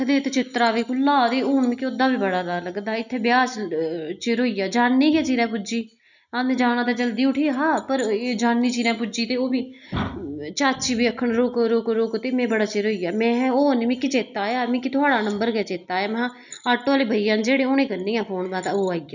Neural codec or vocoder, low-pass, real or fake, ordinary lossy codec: none; 7.2 kHz; real; none